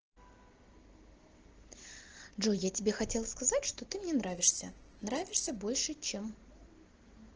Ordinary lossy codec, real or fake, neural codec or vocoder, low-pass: Opus, 16 kbps; real; none; 7.2 kHz